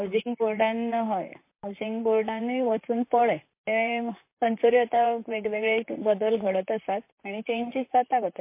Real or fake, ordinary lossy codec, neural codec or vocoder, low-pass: fake; MP3, 24 kbps; vocoder, 44.1 kHz, 128 mel bands, Pupu-Vocoder; 3.6 kHz